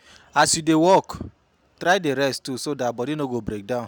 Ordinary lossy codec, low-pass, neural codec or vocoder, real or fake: none; 19.8 kHz; none; real